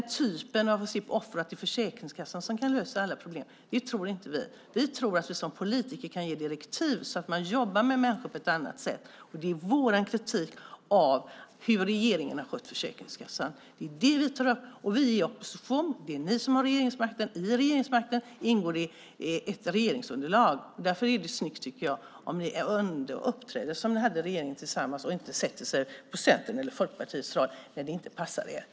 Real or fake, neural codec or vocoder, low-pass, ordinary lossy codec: real; none; none; none